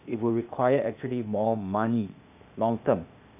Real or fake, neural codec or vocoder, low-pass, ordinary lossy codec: fake; codec, 16 kHz, 0.8 kbps, ZipCodec; 3.6 kHz; none